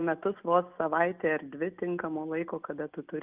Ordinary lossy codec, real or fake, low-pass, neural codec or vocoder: Opus, 24 kbps; real; 3.6 kHz; none